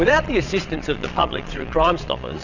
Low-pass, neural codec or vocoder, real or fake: 7.2 kHz; vocoder, 22.05 kHz, 80 mel bands, Vocos; fake